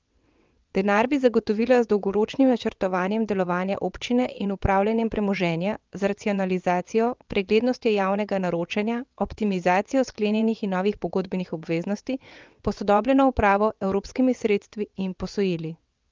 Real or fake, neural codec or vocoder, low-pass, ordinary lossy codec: fake; vocoder, 22.05 kHz, 80 mel bands, WaveNeXt; 7.2 kHz; Opus, 24 kbps